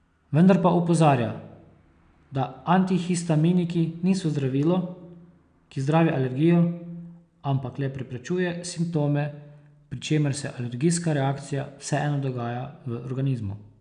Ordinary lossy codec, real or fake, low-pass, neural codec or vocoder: none; real; 9.9 kHz; none